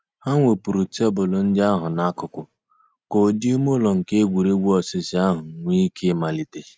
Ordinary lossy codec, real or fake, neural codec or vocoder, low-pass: none; real; none; none